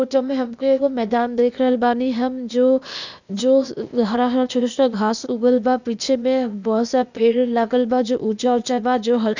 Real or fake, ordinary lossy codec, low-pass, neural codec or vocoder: fake; none; 7.2 kHz; codec, 16 kHz, 0.8 kbps, ZipCodec